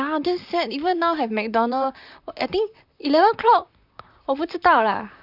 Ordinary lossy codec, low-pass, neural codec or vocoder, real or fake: none; 5.4 kHz; vocoder, 44.1 kHz, 128 mel bands, Pupu-Vocoder; fake